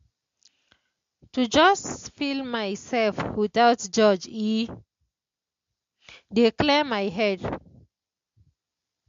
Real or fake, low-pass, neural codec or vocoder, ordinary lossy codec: real; 7.2 kHz; none; MP3, 48 kbps